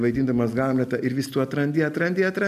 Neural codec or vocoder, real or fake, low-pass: none; real; 14.4 kHz